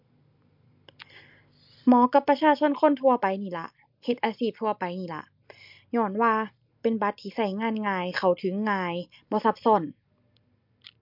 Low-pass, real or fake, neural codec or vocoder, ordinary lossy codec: 5.4 kHz; real; none; MP3, 48 kbps